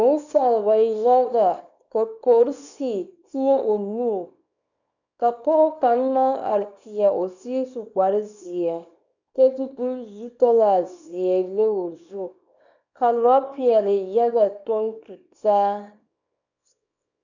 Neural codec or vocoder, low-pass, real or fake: codec, 24 kHz, 0.9 kbps, WavTokenizer, small release; 7.2 kHz; fake